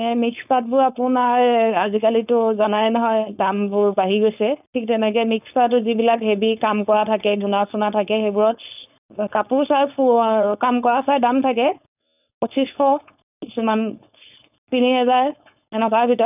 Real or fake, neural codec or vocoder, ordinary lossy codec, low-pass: fake; codec, 16 kHz, 4.8 kbps, FACodec; none; 3.6 kHz